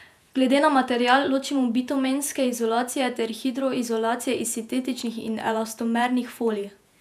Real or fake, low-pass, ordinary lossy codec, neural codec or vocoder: fake; 14.4 kHz; none; vocoder, 48 kHz, 128 mel bands, Vocos